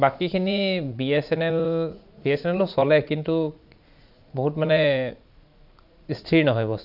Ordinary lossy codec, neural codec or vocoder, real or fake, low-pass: none; vocoder, 44.1 kHz, 80 mel bands, Vocos; fake; 5.4 kHz